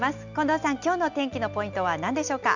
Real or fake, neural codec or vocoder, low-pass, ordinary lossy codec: real; none; 7.2 kHz; none